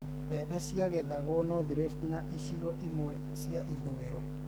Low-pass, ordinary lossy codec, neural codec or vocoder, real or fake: none; none; codec, 44.1 kHz, 2.6 kbps, SNAC; fake